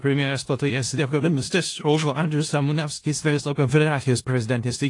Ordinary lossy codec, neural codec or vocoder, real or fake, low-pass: AAC, 48 kbps; codec, 16 kHz in and 24 kHz out, 0.4 kbps, LongCat-Audio-Codec, four codebook decoder; fake; 10.8 kHz